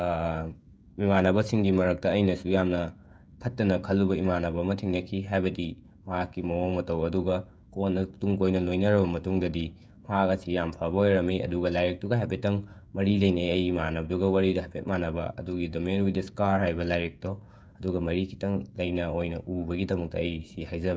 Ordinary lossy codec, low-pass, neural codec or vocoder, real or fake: none; none; codec, 16 kHz, 16 kbps, FreqCodec, smaller model; fake